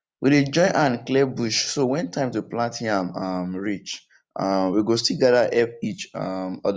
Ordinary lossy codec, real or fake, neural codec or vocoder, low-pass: none; real; none; none